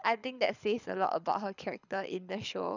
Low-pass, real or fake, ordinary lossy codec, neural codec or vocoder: 7.2 kHz; fake; none; codec, 24 kHz, 6 kbps, HILCodec